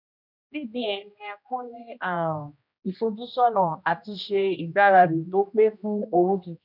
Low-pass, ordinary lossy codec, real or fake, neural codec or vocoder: 5.4 kHz; none; fake; codec, 16 kHz, 1 kbps, X-Codec, HuBERT features, trained on general audio